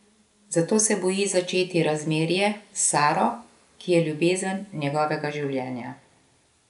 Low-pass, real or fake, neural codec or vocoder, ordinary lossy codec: 10.8 kHz; real; none; none